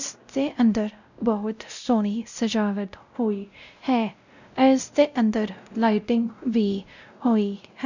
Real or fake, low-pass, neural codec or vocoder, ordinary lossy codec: fake; 7.2 kHz; codec, 16 kHz, 0.5 kbps, X-Codec, WavLM features, trained on Multilingual LibriSpeech; none